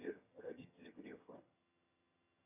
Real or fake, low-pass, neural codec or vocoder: fake; 3.6 kHz; vocoder, 22.05 kHz, 80 mel bands, HiFi-GAN